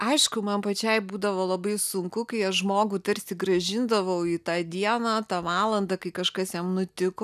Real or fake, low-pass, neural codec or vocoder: real; 14.4 kHz; none